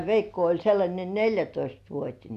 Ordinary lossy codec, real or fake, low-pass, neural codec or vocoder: none; real; 14.4 kHz; none